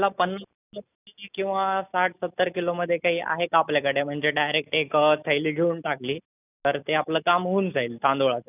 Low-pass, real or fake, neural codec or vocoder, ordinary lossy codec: 3.6 kHz; real; none; none